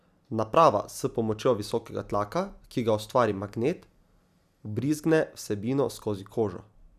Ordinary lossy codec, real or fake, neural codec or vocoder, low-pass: none; real; none; 14.4 kHz